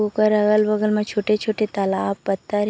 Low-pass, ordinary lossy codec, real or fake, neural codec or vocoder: none; none; real; none